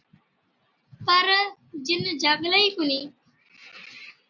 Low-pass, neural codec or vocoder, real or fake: 7.2 kHz; none; real